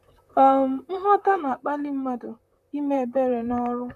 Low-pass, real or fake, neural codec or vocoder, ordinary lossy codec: 14.4 kHz; fake; vocoder, 44.1 kHz, 128 mel bands, Pupu-Vocoder; none